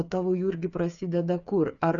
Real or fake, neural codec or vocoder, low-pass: fake; codec, 16 kHz, 8 kbps, FreqCodec, smaller model; 7.2 kHz